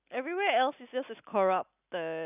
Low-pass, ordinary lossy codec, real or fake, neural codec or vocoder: 3.6 kHz; none; real; none